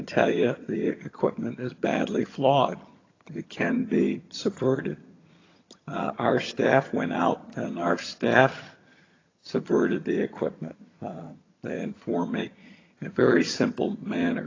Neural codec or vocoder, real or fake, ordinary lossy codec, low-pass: vocoder, 22.05 kHz, 80 mel bands, HiFi-GAN; fake; AAC, 32 kbps; 7.2 kHz